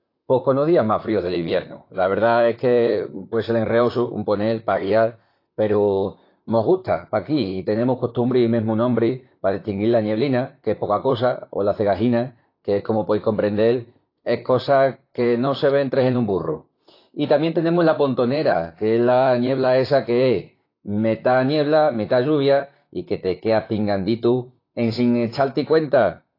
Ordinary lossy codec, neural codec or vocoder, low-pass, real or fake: AAC, 32 kbps; vocoder, 44.1 kHz, 128 mel bands, Pupu-Vocoder; 5.4 kHz; fake